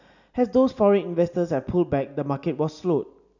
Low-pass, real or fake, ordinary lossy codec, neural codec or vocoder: 7.2 kHz; real; none; none